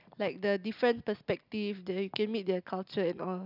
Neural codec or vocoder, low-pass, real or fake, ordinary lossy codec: none; 5.4 kHz; real; none